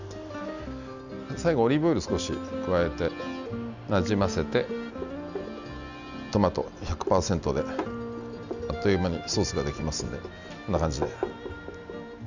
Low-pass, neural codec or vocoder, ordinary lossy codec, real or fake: 7.2 kHz; none; none; real